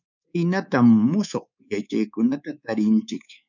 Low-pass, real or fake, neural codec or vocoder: 7.2 kHz; fake; codec, 24 kHz, 3.1 kbps, DualCodec